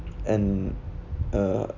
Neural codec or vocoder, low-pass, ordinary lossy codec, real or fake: none; 7.2 kHz; none; real